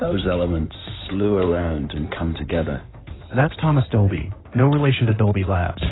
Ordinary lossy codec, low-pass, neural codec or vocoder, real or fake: AAC, 16 kbps; 7.2 kHz; codec, 16 kHz in and 24 kHz out, 2.2 kbps, FireRedTTS-2 codec; fake